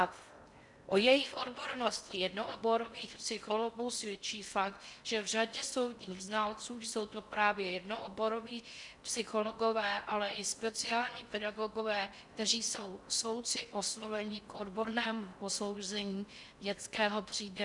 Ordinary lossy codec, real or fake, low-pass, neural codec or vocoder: MP3, 96 kbps; fake; 10.8 kHz; codec, 16 kHz in and 24 kHz out, 0.6 kbps, FocalCodec, streaming, 4096 codes